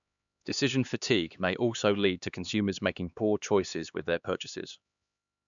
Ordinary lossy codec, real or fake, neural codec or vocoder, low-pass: MP3, 96 kbps; fake; codec, 16 kHz, 2 kbps, X-Codec, HuBERT features, trained on LibriSpeech; 7.2 kHz